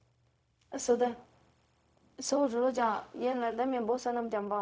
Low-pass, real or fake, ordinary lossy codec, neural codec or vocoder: none; fake; none; codec, 16 kHz, 0.4 kbps, LongCat-Audio-Codec